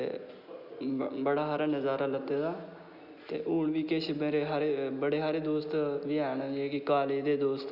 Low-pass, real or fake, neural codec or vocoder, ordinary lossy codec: 5.4 kHz; real; none; none